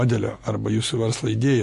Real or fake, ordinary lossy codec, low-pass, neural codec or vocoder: real; MP3, 48 kbps; 14.4 kHz; none